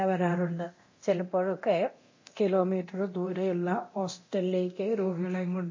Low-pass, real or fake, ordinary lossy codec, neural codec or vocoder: 7.2 kHz; fake; MP3, 32 kbps; codec, 24 kHz, 0.9 kbps, DualCodec